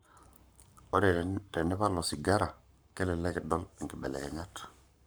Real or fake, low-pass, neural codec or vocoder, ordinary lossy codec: fake; none; codec, 44.1 kHz, 7.8 kbps, Pupu-Codec; none